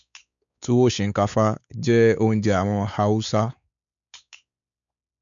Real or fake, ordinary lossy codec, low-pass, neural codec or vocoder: fake; none; 7.2 kHz; codec, 16 kHz, 4 kbps, X-Codec, WavLM features, trained on Multilingual LibriSpeech